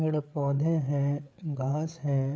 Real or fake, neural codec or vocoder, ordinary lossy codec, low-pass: fake; codec, 16 kHz, 8 kbps, FreqCodec, larger model; none; none